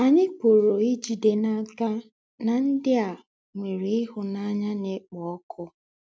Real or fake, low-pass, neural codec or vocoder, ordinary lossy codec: real; none; none; none